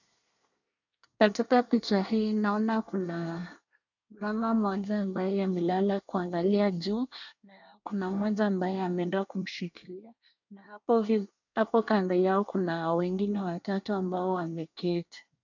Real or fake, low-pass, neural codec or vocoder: fake; 7.2 kHz; codec, 24 kHz, 1 kbps, SNAC